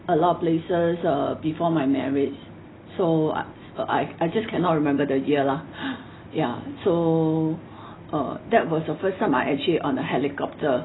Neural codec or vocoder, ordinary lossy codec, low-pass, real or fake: none; AAC, 16 kbps; 7.2 kHz; real